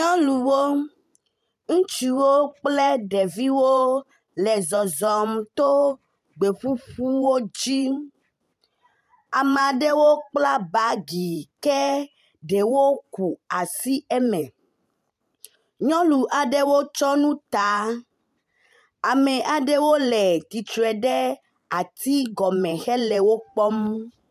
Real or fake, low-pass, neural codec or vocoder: fake; 14.4 kHz; vocoder, 44.1 kHz, 128 mel bands every 512 samples, BigVGAN v2